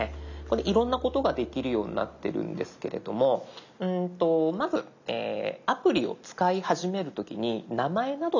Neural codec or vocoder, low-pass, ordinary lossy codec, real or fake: none; 7.2 kHz; none; real